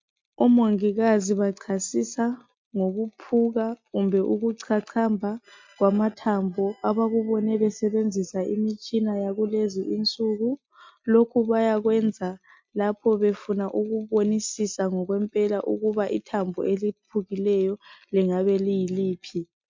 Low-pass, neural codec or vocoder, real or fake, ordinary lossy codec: 7.2 kHz; none; real; MP3, 48 kbps